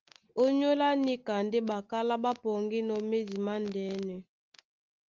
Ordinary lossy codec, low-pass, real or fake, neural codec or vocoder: Opus, 32 kbps; 7.2 kHz; real; none